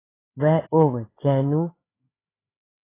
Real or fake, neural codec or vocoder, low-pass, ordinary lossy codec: fake; codec, 16 kHz, 8 kbps, FreqCodec, larger model; 3.6 kHz; AAC, 24 kbps